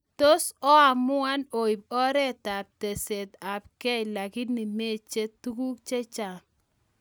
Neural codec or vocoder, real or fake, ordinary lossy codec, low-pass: none; real; none; none